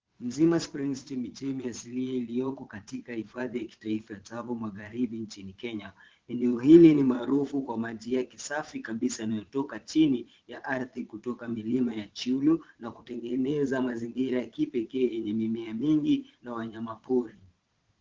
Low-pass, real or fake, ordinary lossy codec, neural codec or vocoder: 7.2 kHz; fake; Opus, 16 kbps; vocoder, 22.05 kHz, 80 mel bands, Vocos